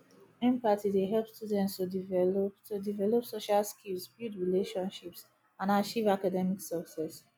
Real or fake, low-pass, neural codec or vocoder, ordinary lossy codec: real; none; none; none